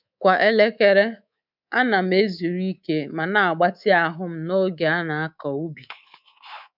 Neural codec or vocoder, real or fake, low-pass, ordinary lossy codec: codec, 24 kHz, 3.1 kbps, DualCodec; fake; 5.4 kHz; none